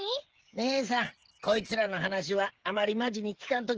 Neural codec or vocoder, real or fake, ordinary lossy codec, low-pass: codec, 16 kHz, 8 kbps, FreqCodec, smaller model; fake; Opus, 16 kbps; 7.2 kHz